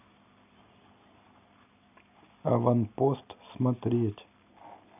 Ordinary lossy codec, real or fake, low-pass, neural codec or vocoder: none; real; 3.6 kHz; none